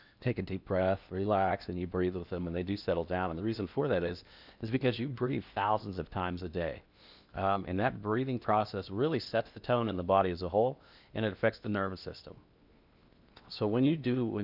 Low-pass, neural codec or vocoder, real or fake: 5.4 kHz; codec, 16 kHz in and 24 kHz out, 0.8 kbps, FocalCodec, streaming, 65536 codes; fake